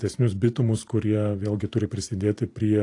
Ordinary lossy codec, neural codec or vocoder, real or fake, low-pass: AAC, 48 kbps; vocoder, 44.1 kHz, 128 mel bands every 512 samples, BigVGAN v2; fake; 10.8 kHz